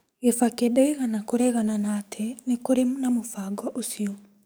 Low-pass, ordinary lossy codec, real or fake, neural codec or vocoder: none; none; fake; codec, 44.1 kHz, 7.8 kbps, DAC